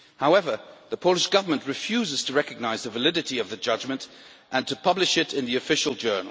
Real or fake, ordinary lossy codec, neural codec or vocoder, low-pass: real; none; none; none